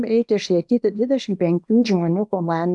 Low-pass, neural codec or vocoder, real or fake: 10.8 kHz; codec, 24 kHz, 0.9 kbps, WavTokenizer, small release; fake